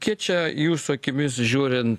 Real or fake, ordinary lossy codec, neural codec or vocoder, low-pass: real; AAC, 64 kbps; none; 14.4 kHz